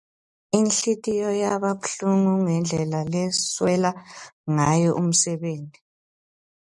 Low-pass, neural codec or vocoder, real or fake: 10.8 kHz; none; real